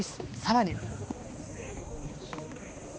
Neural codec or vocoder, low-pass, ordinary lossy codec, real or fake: codec, 16 kHz, 2 kbps, X-Codec, HuBERT features, trained on balanced general audio; none; none; fake